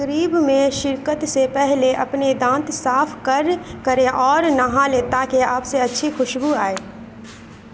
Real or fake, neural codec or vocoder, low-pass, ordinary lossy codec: real; none; none; none